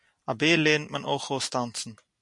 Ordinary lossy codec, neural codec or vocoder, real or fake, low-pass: MP3, 64 kbps; none; real; 10.8 kHz